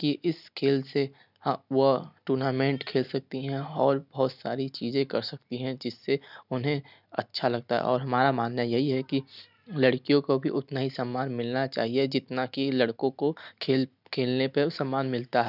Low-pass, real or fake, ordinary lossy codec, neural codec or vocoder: 5.4 kHz; real; none; none